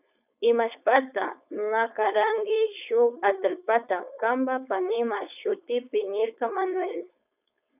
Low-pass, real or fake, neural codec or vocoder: 3.6 kHz; fake; codec, 16 kHz, 4.8 kbps, FACodec